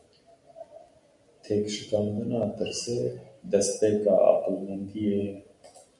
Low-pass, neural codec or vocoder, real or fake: 10.8 kHz; none; real